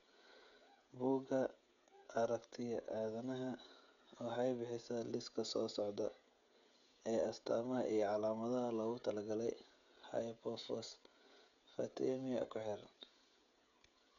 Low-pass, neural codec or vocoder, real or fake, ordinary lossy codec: 7.2 kHz; codec, 16 kHz, 16 kbps, FreqCodec, smaller model; fake; none